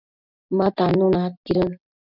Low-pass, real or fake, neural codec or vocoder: 5.4 kHz; real; none